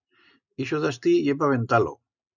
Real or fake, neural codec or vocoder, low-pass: real; none; 7.2 kHz